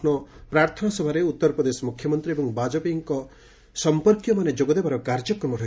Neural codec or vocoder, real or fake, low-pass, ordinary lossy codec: none; real; none; none